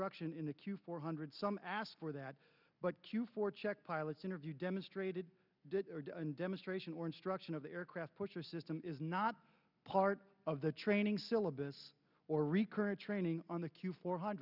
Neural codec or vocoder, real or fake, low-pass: none; real; 5.4 kHz